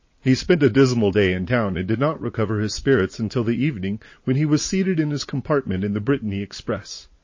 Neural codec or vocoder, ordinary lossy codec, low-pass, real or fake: vocoder, 44.1 kHz, 80 mel bands, Vocos; MP3, 32 kbps; 7.2 kHz; fake